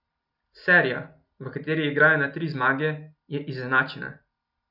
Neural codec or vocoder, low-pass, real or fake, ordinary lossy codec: none; 5.4 kHz; real; none